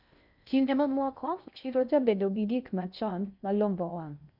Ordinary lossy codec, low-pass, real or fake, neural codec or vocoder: none; 5.4 kHz; fake; codec, 16 kHz in and 24 kHz out, 0.6 kbps, FocalCodec, streaming, 2048 codes